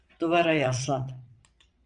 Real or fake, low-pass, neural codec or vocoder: fake; 9.9 kHz; vocoder, 22.05 kHz, 80 mel bands, Vocos